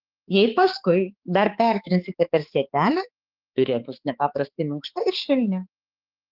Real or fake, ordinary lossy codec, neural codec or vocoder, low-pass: fake; Opus, 16 kbps; codec, 16 kHz, 4 kbps, X-Codec, HuBERT features, trained on balanced general audio; 5.4 kHz